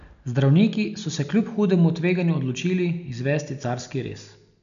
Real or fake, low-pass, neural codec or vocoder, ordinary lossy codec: real; 7.2 kHz; none; none